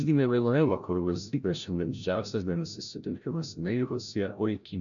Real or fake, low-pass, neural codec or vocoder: fake; 7.2 kHz; codec, 16 kHz, 0.5 kbps, FreqCodec, larger model